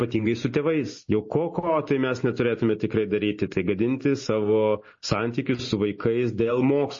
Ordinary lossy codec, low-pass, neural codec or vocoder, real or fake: MP3, 32 kbps; 7.2 kHz; none; real